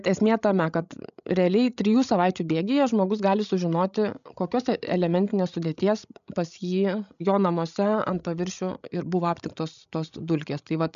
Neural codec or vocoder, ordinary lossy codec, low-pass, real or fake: codec, 16 kHz, 16 kbps, FreqCodec, larger model; MP3, 96 kbps; 7.2 kHz; fake